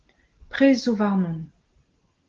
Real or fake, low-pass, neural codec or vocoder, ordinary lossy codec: real; 7.2 kHz; none; Opus, 16 kbps